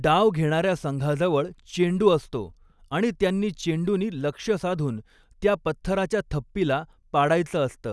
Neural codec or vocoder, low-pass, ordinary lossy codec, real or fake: none; none; none; real